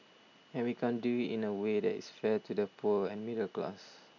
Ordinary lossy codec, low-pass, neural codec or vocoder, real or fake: none; 7.2 kHz; none; real